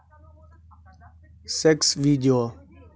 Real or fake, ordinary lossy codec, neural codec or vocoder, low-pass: real; none; none; none